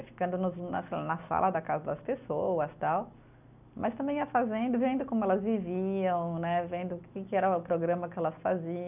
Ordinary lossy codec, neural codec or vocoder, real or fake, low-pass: none; none; real; 3.6 kHz